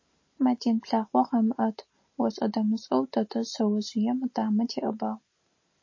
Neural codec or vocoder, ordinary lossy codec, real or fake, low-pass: none; MP3, 32 kbps; real; 7.2 kHz